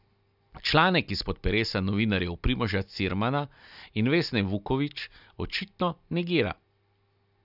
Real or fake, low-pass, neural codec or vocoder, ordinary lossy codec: real; 5.4 kHz; none; none